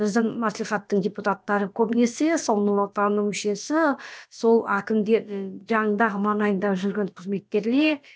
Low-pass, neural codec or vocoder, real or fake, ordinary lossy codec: none; codec, 16 kHz, about 1 kbps, DyCAST, with the encoder's durations; fake; none